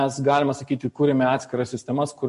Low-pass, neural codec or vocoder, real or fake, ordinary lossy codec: 14.4 kHz; none; real; MP3, 48 kbps